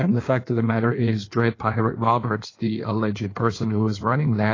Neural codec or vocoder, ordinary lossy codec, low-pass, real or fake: codec, 16 kHz in and 24 kHz out, 1.1 kbps, FireRedTTS-2 codec; AAC, 32 kbps; 7.2 kHz; fake